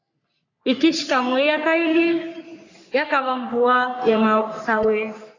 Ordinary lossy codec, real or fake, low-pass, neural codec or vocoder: AAC, 48 kbps; fake; 7.2 kHz; codec, 44.1 kHz, 3.4 kbps, Pupu-Codec